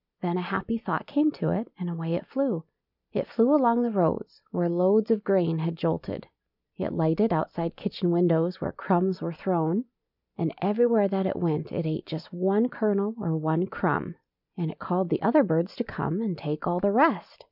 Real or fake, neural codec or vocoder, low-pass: real; none; 5.4 kHz